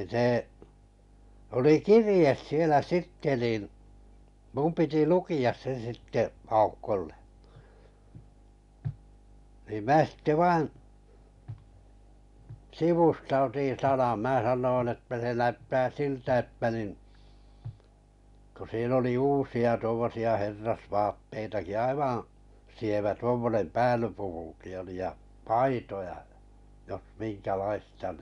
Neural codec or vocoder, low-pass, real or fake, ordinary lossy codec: none; 10.8 kHz; real; none